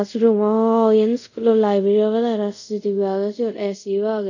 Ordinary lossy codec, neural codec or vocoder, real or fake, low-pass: none; codec, 24 kHz, 0.5 kbps, DualCodec; fake; 7.2 kHz